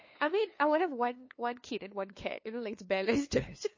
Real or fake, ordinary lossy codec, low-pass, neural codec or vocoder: fake; MP3, 32 kbps; 7.2 kHz; codec, 16 kHz, 2 kbps, FunCodec, trained on LibriTTS, 25 frames a second